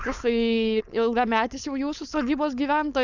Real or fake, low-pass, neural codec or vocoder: fake; 7.2 kHz; codec, 16 kHz, 4.8 kbps, FACodec